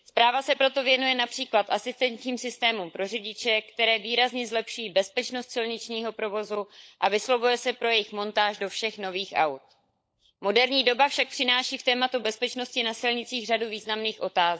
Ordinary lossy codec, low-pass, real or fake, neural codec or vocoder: none; none; fake; codec, 16 kHz, 16 kbps, FunCodec, trained on LibriTTS, 50 frames a second